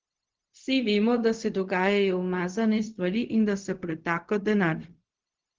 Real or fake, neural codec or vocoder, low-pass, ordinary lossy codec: fake; codec, 16 kHz, 0.4 kbps, LongCat-Audio-Codec; 7.2 kHz; Opus, 16 kbps